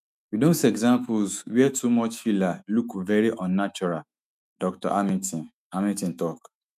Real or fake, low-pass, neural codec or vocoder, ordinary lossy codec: fake; 14.4 kHz; autoencoder, 48 kHz, 128 numbers a frame, DAC-VAE, trained on Japanese speech; none